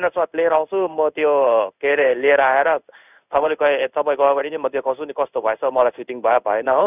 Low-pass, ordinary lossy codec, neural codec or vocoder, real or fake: 3.6 kHz; none; codec, 16 kHz in and 24 kHz out, 1 kbps, XY-Tokenizer; fake